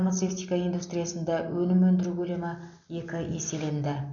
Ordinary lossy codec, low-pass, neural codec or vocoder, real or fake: none; 7.2 kHz; none; real